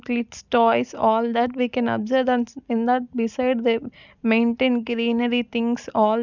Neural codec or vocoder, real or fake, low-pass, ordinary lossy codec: none; real; 7.2 kHz; none